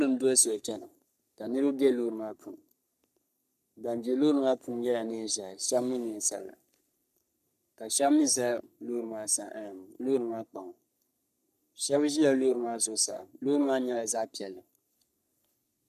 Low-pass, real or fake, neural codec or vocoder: 14.4 kHz; fake; codec, 44.1 kHz, 3.4 kbps, Pupu-Codec